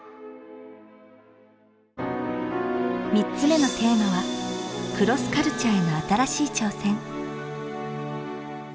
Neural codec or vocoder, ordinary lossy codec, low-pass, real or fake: none; none; none; real